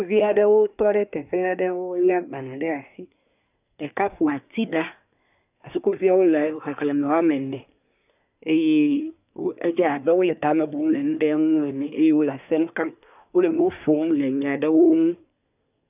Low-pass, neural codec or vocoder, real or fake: 3.6 kHz; codec, 24 kHz, 1 kbps, SNAC; fake